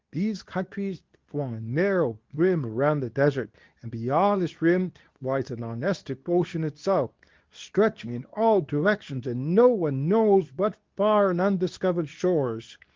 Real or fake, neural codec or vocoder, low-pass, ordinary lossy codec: fake; codec, 24 kHz, 0.9 kbps, WavTokenizer, medium speech release version 2; 7.2 kHz; Opus, 32 kbps